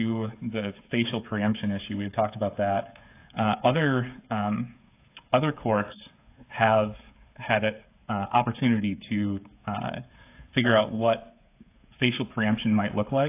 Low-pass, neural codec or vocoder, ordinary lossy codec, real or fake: 3.6 kHz; codec, 16 kHz, 8 kbps, FreqCodec, smaller model; AAC, 24 kbps; fake